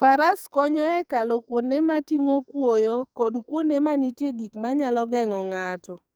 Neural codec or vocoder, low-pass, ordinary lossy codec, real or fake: codec, 44.1 kHz, 2.6 kbps, SNAC; none; none; fake